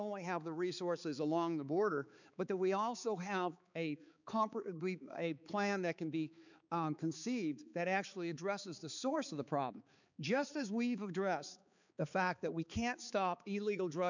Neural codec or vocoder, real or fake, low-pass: codec, 16 kHz, 4 kbps, X-Codec, HuBERT features, trained on balanced general audio; fake; 7.2 kHz